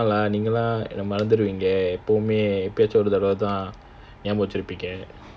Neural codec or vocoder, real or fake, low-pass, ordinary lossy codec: none; real; none; none